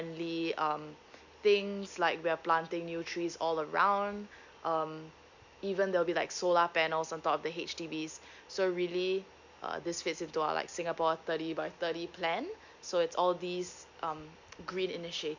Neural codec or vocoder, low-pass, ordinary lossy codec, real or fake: none; 7.2 kHz; none; real